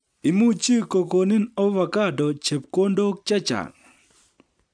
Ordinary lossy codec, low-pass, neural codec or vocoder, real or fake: none; 9.9 kHz; none; real